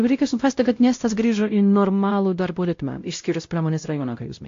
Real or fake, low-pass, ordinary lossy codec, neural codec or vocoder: fake; 7.2 kHz; AAC, 48 kbps; codec, 16 kHz, 0.5 kbps, X-Codec, WavLM features, trained on Multilingual LibriSpeech